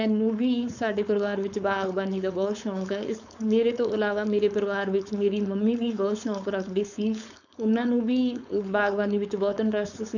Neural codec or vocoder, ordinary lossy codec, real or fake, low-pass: codec, 16 kHz, 4.8 kbps, FACodec; none; fake; 7.2 kHz